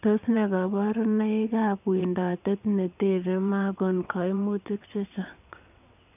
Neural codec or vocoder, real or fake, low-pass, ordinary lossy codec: vocoder, 22.05 kHz, 80 mel bands, WaveNeXt; fake; 3.6 kHz; none